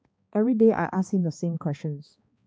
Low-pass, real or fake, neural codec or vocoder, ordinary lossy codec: none; fake; codec, 16 kHz, 2 kbps, X-Codec, HuBERT features, trained on balanced general audio; none